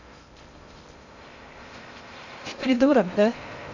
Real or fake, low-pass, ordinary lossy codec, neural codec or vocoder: fake; 7.2 kHz; none; codec, 16 kHz in and 24 kHz out, 0.6 kbps, FocalCodec, streaming, 2048 codes